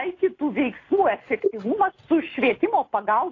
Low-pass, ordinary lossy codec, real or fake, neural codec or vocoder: 7.2 kHz; AAC, 32 kbps; real; none